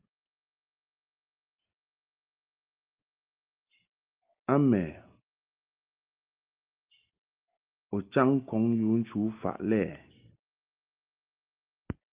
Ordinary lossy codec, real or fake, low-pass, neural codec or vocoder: Opus, 32 kbps; real; 3.6 kHz; none